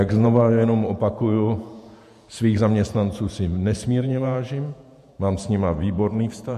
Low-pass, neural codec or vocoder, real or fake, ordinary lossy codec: 14.4 kHz; vocoder, 48 kHz, 128 mel bands, Vocos; fake; MP3, 64 kbps